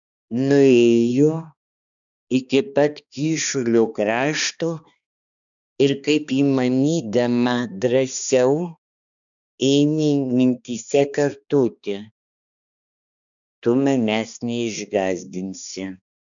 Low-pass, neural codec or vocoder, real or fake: 7.2 kHz; codec, 16 kHz, 2 kbps, X-Codec, HuBERT features, trained on balanced general audio; fake